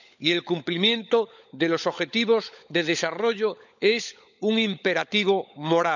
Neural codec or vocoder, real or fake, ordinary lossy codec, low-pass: codec, 16 kHz, 16 kbps, FunCodec, trained on LibriTTS, 50 frames a second; fake; none; 7.2 kHz